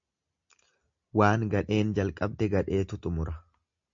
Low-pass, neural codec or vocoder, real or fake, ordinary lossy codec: 7.2 kHz; none; real; MP3, 48 kbps